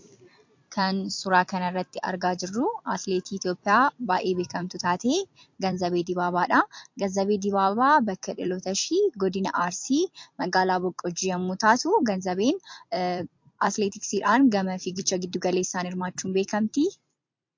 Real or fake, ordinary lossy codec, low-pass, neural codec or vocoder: fake; MP3, 48 kbps; 7.2 kHz; vocoder, 44.1 kHz, 128 mel bands every 256 samples, BigVGAN v2